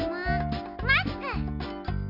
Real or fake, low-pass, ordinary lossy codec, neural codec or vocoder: real; 5.4 kHz; none; none